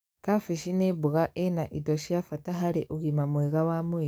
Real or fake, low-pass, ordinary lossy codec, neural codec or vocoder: fake; none; none; codec, 44.1 kHz, 7.8 kbps, DAC